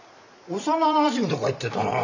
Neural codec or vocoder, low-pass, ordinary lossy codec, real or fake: vocoder, 44.1 kHz, 128 mel bands every 512 samples, BigVGAN v2; 7.2 kHz; none; fake